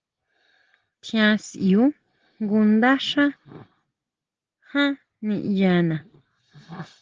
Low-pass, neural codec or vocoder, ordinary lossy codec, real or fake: 7.2 kHz; none; Opus, 16 kbps; real